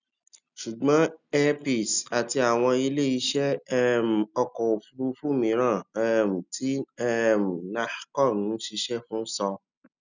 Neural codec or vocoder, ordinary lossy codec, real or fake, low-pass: none; none; real; 7.2 kHz